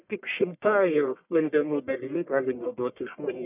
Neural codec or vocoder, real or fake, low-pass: codec, 44.1 kHz, 1.7 kbps, Pupu-Codec; fake; 3.6 kHz